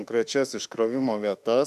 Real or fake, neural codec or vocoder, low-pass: fake; autoencoder, 48 kHz, 32 numbers a frame, DAC-VAE, trained on Japanese speech; 14.4 kHz